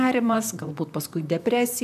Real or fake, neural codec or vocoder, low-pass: fake; vocoder, 44.1 kHz, 128 mel bands, Pupu-Vocoder; 14.4 kHz